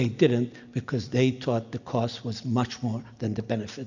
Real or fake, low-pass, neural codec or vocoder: real; 7.2 kHz; none